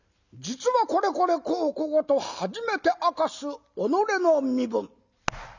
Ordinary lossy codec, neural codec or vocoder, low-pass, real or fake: none; none; 7.2 kHz; real